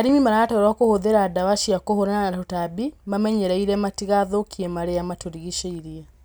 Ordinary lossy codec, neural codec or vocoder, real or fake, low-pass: none; none; real; none